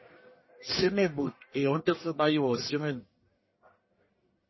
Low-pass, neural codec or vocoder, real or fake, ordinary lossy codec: 7.2 kHz; codec, 44.1 kHz, 1.7 kbps, Pupu-Codec; fake; MP3, 24 kbps